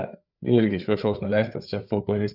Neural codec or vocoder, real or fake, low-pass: codec, 16 kHz, 4 kbps, FreqCodec, larger model; fake; 5.4 kHz